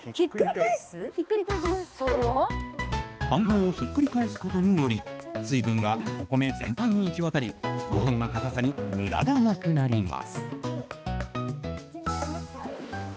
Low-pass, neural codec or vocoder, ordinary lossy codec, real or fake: none; codec, 16 kHz, 2 kbps, X-Codec, HuBERT features, trained on balanced general audio; none; fake